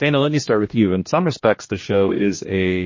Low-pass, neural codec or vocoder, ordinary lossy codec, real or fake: 7.2 kHz; codec, 16 kHz, 1 kbps, X-Codec, HuBERT features, trained on general audio; MP3, 32 kbps; fake